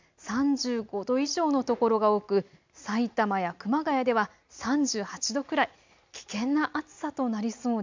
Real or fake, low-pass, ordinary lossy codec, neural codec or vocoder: real; 7.2 kHz; MP3, 64 kbps; none